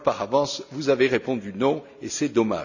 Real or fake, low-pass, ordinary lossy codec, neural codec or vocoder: real; 7.2 kHz; none; none